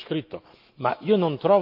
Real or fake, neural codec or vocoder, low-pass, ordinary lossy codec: fake; autoencoder, 48 kHz, 128 numbers a frame, DAC-VAE, trained on Japanese speech; 5.4 kHz; Opus, 24 kbps